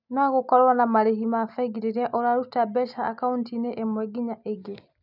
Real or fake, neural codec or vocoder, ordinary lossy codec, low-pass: real; none; none; 5.4 kHz